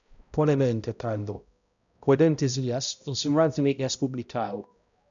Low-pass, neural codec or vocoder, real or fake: 7.2 kHz; codec, 16 kHz, 0.5 kbps, X-Codec, HuBERT features, trained on balanced general audio; fake